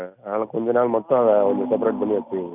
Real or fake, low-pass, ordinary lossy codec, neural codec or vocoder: real; 3.6 kHz; none; none